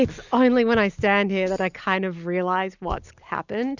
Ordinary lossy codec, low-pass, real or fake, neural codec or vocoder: Opus, 64 kbps; 7.2 kHz; real; none